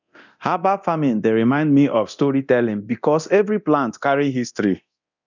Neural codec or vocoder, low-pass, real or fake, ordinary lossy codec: codec, 24 kHz, 0.9 kbps, DualCodec; 7.2 kHz; fake; none